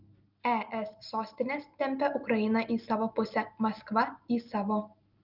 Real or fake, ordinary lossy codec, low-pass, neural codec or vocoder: real; Opus, 32 kbps; 5.4 kHz; none